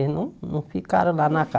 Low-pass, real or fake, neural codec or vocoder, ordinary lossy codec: none; real; none; none